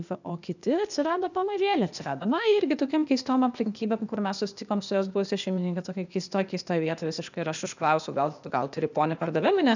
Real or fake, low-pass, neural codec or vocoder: fake; 7.2 kHz; codec, 16 kHz, 0.8 kbps, ZipCodec